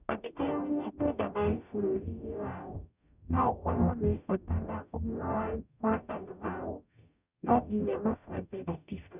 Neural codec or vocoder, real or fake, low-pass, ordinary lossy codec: codec, 44.1 kHz, 0.9 kbps, DAC; fake; 3.6 kHz; none